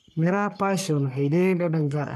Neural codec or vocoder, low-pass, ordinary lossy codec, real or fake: codec, 44.1 kHz, 3.4 kbps, Pupu-Codec; 14.4 kHz; none; fake